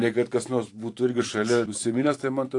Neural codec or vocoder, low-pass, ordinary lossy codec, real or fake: vocoder, 44.1 kHz, 128 mel bands every 512 samples, BigVGAN v2; 10.8 kHz; AAC, 48 kbps; fake